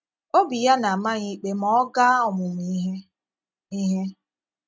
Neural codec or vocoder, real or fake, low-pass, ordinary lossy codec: none; real; none; none